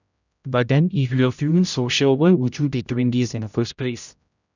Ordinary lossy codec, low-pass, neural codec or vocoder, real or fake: none; 7.2 kHz; codec, 16 kHz, 0.5 kbps, X-Codec, HuBERT features, trained on general audio; fake